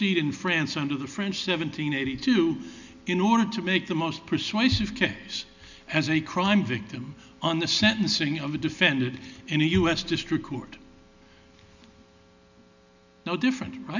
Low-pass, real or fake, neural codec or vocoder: 7.2 kHz; real; none